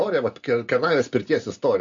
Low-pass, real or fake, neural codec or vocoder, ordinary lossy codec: 7.2 kHz; real; none; MP3, 48 kbps